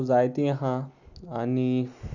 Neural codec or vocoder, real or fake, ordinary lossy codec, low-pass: none; real; none; 7.2 kHz